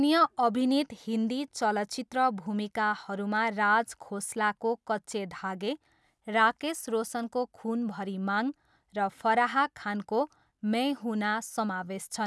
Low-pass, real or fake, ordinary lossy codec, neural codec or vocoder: none; real; none; none